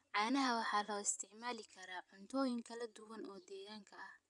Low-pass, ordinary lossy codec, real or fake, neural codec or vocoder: 10.8 kHz; none; fake; vocoder, 24 kHz, 100 mel bands, Vocos